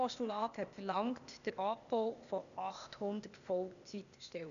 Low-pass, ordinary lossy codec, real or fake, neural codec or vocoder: 7.2 kHz; none; fake; codec, 16 kHz, 0.8 kbps, ZipCodec